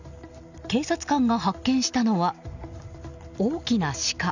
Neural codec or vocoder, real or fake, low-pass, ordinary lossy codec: none; real; 7.2 kHz; none